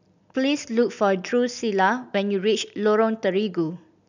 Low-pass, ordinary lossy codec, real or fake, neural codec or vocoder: 7.2 kHz; none; real; none